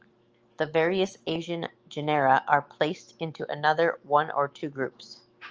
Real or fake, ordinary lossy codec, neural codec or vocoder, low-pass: fake; Opus, 24 kbps; autoencoder, 48 kHz, 128 numbers a frame, DAC-VAE, trained on Japanese speech; 7.2 kHz